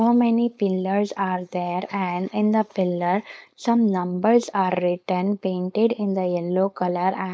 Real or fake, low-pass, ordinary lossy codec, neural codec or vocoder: fake; none; none; codec, 16 kHz, 4.8 kbps, FACodec